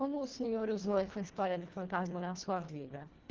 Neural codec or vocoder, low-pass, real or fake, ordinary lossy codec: codec, 24 kHz, 1.5 kbps, HILCodec; 7.2 kHz; fake; Opus, 16 kbps